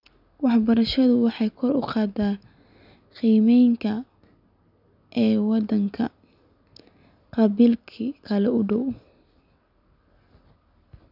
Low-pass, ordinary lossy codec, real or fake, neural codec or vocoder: 5.4 kHz; none; real; none